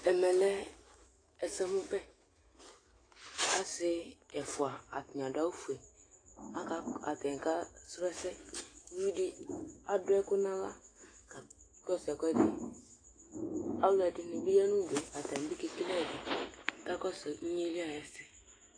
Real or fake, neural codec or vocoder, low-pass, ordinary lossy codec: fake; autoencoder, 48 kHz, 128 numbers a frame, DAC-VAE, trained on Japanese speech; 9.9 kHz; AAC, 32 kbps